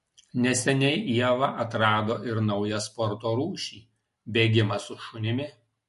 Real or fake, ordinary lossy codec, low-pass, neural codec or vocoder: real; MP3, 48 kbps; 14.4 kHz; none